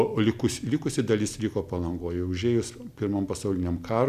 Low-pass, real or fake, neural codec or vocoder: 14.4 kHz; real; none